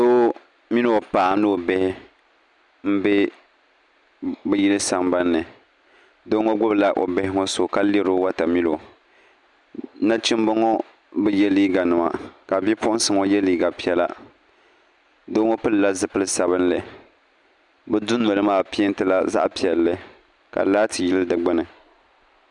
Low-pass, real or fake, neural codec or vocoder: 10.8 kHz; real; none